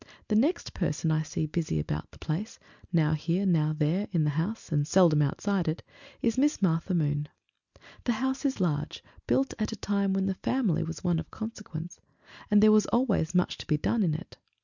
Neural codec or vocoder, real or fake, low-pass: none; real; 7.2 kHz